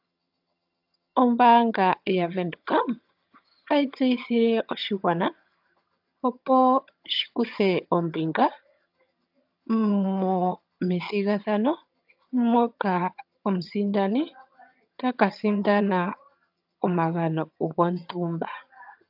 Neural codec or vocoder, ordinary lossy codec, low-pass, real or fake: vocoder, 22.05 kHz, 80 mel bands, HiFi-GAN; AAC, 48 kbps; 5.4 kHz; fake